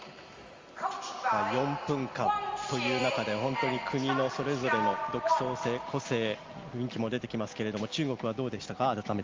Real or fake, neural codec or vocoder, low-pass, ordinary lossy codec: real; none; 7.2 kHz; Opus, 32 kbps